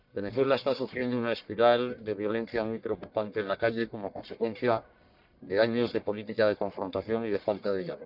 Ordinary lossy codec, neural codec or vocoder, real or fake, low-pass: none; codec, 44.1 kHz, 1.7 kbps, Pupu-Codec; fake; 5.4 kHz